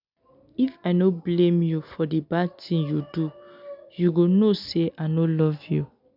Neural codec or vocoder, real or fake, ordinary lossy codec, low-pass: none; real; none; 5.4 kHz